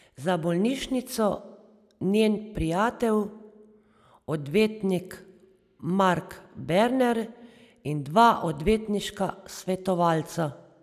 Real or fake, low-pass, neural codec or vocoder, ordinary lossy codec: real; 14.4 kHz; none; none